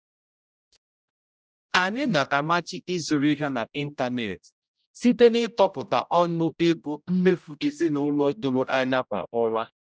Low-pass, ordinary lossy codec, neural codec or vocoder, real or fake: none; none; codec, 16 kHz, 0.5 kbps, X-Codec, HuBERT features, trained on general audio; fake